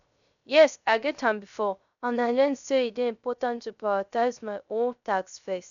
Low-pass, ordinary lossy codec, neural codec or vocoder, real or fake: 7.2 kHz; none; codec, 16 kHz, 0.3 kbps, FocalCodec; fake